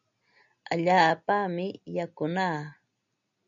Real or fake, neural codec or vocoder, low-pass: real; none; 7.2 kHz